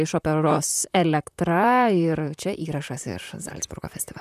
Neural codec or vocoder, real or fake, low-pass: vocoder, 44.1 kHz, 128 mel bands, Pupu-Vocoder; fake; 14.4 kHz